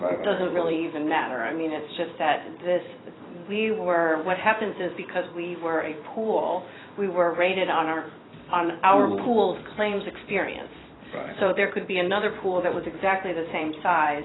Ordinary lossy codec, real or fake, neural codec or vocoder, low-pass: AAC, 16 kbps; fake; vocoder, 44.1 kHz, 128 mel bands every 256 samples, BigVGAN v2; 7.2 kHz